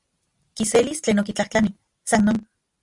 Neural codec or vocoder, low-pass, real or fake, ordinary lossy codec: none; 10.8 kHz; real; Opus, 64 kbps